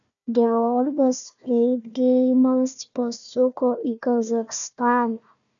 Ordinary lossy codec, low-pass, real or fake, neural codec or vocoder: MP3, 96 kbps; 7.2 kHz; fake; codec, 16 kHz, 1 kbps, FunCodec, trained on Chinese and English, 50 frames a second